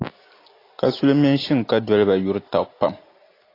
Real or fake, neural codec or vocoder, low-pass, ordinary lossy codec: real; none; 5.4 kHz; AAC, 32 kbps